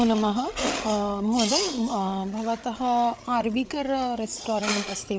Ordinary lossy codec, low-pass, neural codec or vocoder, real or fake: none; none; codec, 16 kHz, 8 kbps, FreqCodec, larger model; fake